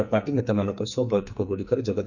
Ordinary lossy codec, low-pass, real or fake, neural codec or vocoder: none; 7.2 kHz; fake; codec, 44.1 kHz, 2.6 kbps, SNAC